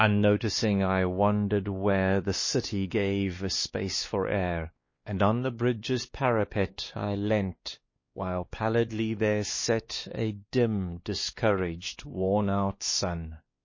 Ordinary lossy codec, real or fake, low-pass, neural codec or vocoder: MP3, 32 kbps; fake; 7.2 kHz; codec, 16 kHz, 2 kbps, X-Codec, WavLM features, trained on Multilingual LibriSpeech